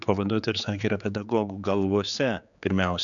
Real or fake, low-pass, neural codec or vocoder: fake; 7.2 kHz; codec, 16 kHz, 4 kbps, X-Codec, HuBERT features, trained on general audio